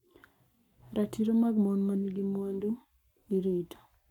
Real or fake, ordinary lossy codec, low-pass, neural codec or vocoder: fake; none; 19.8 kHz; codec, 44.1 kHz, 7.8 kbps, Pupu-Codec